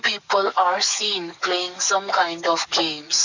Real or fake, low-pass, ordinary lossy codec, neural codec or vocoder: fake; 7.2 kHz; none; codec, 44.1 kHz, 7.8 kbps, DAC